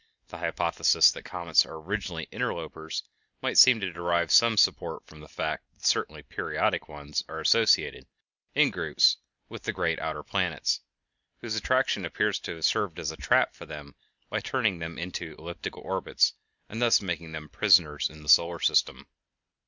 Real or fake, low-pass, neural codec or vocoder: real; 7.2 kHz; none